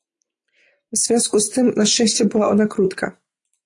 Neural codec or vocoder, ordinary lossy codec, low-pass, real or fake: none; AAC, 48 kbps; 10.8 kHz; real